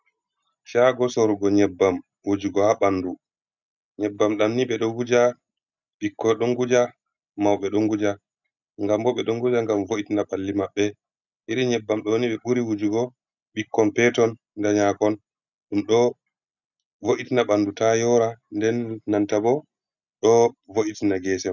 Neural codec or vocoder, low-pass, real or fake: none; 7.2 kHz; real